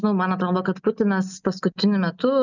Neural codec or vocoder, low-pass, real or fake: none; 7.2 kHz; real